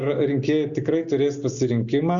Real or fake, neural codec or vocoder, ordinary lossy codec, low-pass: real; none; Opus, 64 kbps; 7.2 kHz